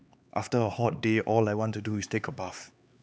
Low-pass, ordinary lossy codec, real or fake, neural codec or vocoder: none; none; fake; codec, 16 kHz, 4 kbps, X-Codec, HuBERT features, trained on LibriSpeech